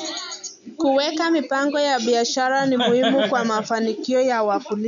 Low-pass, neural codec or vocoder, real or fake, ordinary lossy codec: 7.2 kHz; none; real; none